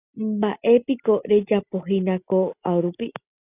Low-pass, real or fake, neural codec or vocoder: 3.6 kHz; real; none